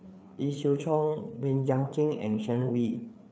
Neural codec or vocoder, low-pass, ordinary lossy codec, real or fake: codec, 16 kHz, 4 kbps, FreqCodec, larger model; none; none; fake